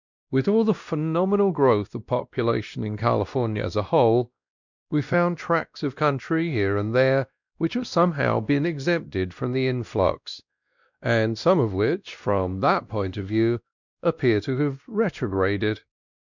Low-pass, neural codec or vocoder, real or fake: 7.2 kHz; codec, 16 kHz, 1 kbps, X-Codec, WavLM features, trained on Multilingual LibriSpeech; fake